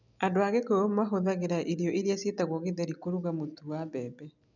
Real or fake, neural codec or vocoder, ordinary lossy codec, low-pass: real; none; none; 7.2 kHz